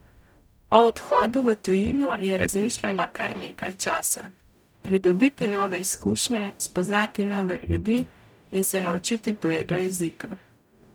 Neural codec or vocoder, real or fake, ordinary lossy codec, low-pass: codec, 44.1 kHz, 0.9 kbps, DAC; fake; none; none